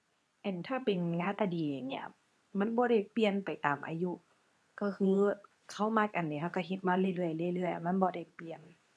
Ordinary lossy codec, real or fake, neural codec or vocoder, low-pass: none; fake; codec, 24 kHz, 0.9 kbps, WavTokenizer, medium speech release version 2; none